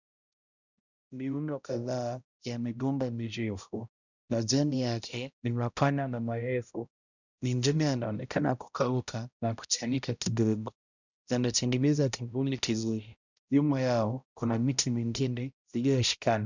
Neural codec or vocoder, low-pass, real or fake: codec, 16 kHz, 0.5 kbps, X-Codec, HuBERT features, trained on balanced general audio; 7.2 kHz; fake